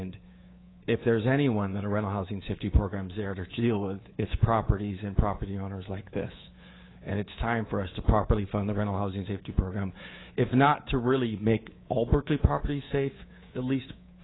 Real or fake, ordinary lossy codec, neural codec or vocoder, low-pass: fake; AAC, 16 kbps; codec, 24 kHz, 3.1 kbps, DualCodec; 7.2 kHz